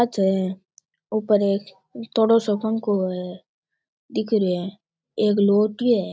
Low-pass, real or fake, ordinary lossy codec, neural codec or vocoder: none; real; none; none